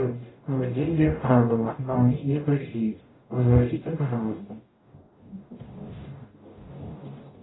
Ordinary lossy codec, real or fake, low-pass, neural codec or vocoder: AAC, 16 kbps; fake; 7.2 kHz; codec, 44.1 kHz, 0.9 kbps, DAC